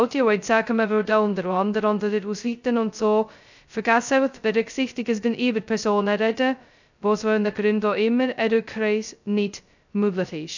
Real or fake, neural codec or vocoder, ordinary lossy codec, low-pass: fake; codec, 16 kHz, 0.2 kbps, FocalCodec; none; 7.2 kHz